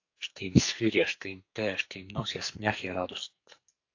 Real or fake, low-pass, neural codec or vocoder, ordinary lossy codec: fake; 7.2 kHz; codec, 44.1 kHz, 2.6 kbps, SNAC; AAC, 48 kbps